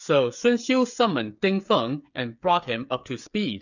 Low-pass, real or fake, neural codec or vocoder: 7.2 kHz; fake; codec, 16 kHz, 8 kbps, FreqCodec, smaller model